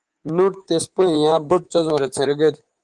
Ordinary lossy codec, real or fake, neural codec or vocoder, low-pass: Opus, 24 kbps; fake; codec, 24 kHz, 3.1 kbps, DualCodec; 10.8 kHz